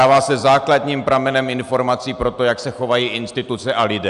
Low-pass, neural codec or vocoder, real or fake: 10.8 kHz; none; real